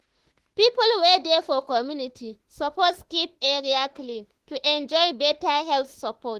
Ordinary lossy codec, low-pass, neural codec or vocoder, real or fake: Opus, 16 kbps; 14.4 kHz; autoencoder, 48 kHz, 32 numbers a frame, DAC-VAE, trained on Japanese speech; fake